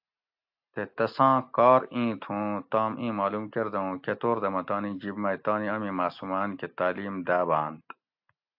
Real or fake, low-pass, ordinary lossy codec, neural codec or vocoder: real; 5.4 kHz; AAC, 48 kbps; none